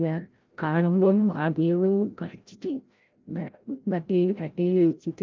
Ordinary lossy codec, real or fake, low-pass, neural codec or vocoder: Opus, 24 kbps; fake; 7.2 kHz; codec, 16 kHz, 0.5 kbps, FreqCodec, larger model